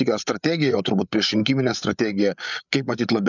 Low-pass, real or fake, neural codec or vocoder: 7.2 kHz; fake; codec, 16 kHz, 8 kbps, FreqCodec, larger model